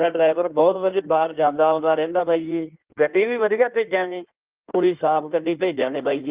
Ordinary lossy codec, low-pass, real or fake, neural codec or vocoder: Opus, 32 kbps; 3.6 kHz; fake; codec, 16 kHz in and 24 kHz out, 1.1 kbps, FireRedTTS-2 codec